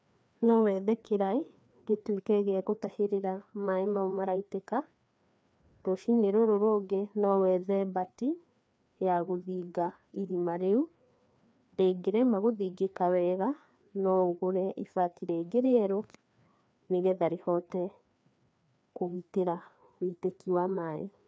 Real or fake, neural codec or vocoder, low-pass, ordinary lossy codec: fake; codec, 16 kHz, 2 kbps, FreqCodec, larger model; none; none